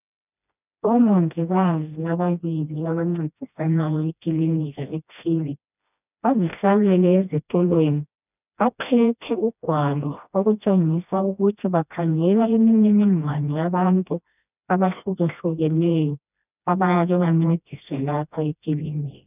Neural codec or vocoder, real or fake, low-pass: codec, 16 kHz, 1 kbps, FreqCodec, smaller model; fake; 3.6 kHz